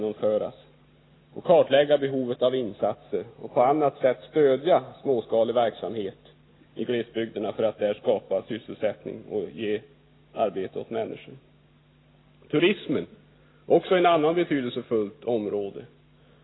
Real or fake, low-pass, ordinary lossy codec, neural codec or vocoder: real; 7.2 kHz; AAC, 16 kbps; none